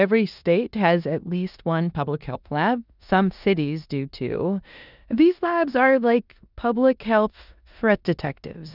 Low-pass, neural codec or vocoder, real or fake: 5.4 kHz; codec, 16 kHz in and 24 kHz out, 0.9 kbps, LongCat-Audio-Codec, four codebook decoder; fake